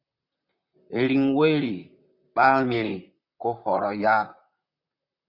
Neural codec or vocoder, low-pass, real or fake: vocoder, 44.1 kHz, 128 mel bands, Pupu-Vocoder; 5.4 kHz; fake